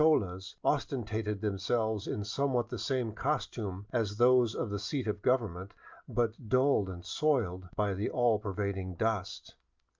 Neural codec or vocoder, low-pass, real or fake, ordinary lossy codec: none; 7.2 kHz; real; Opus, 24 kbps